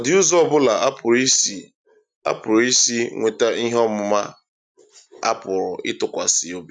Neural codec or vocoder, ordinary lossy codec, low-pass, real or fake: none; none; 9.9 kHz; real